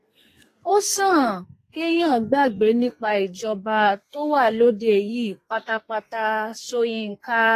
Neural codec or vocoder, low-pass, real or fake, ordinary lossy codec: codec, 32 kHz, 1.9 kbps, SNAC; 14.4 kHz; fake; AAC, 48 kbps